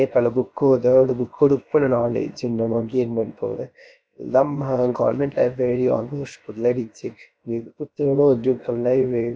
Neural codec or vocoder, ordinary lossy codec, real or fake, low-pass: codec, 16 kHz, about 1 kbps, DyCAST, with the encoder's durations; none; fake; none